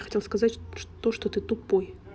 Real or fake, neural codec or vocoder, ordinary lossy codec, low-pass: real; none; none; none